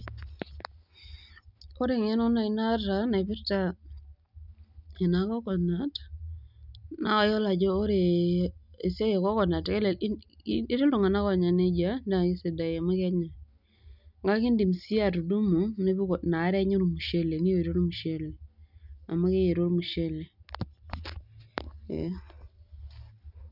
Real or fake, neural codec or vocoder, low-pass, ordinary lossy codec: real; none; 5.4 kHz; none